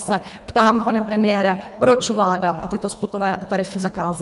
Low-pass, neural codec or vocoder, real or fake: 10.8 kHz; codec, 24 kHz, 1.5 kbps, HILCodec; fake